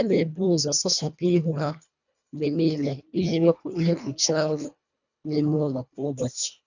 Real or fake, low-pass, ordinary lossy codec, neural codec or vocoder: fake; 7.2 kHz; none; codec, 24 kHz, 1.5 kbps, HILCodec